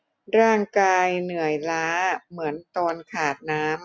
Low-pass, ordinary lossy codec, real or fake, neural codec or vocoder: none; none; real; none